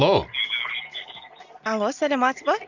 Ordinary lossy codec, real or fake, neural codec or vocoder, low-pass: none; real; none; 7.2 kHz